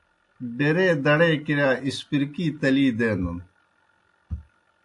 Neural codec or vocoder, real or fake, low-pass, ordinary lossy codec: none; real; 10.8 kHz; AAC, 64 kbps